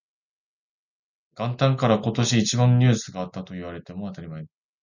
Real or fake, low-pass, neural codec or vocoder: real; 7.2 kHz; none